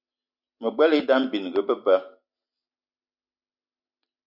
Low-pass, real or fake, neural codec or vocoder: 5.4 kHz; real; none